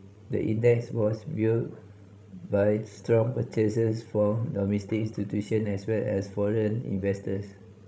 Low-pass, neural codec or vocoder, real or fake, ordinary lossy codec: none; codec, 16 kHz, 16 kbps, FreqCodec, larger model; fake; none